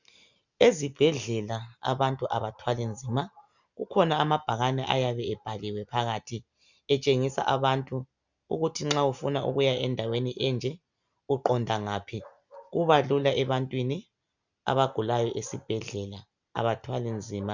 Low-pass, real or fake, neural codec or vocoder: 7.2 kHz; real; none